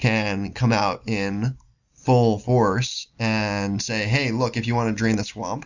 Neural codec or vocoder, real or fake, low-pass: none; real; 7.2 kHz